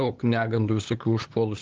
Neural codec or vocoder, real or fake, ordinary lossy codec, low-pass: codec, 16 kHz, 8 kbps, FunCodec, trained on LibriTTS, 25 frames a second; fake; Opus, 24 kbps; 7.2 kHz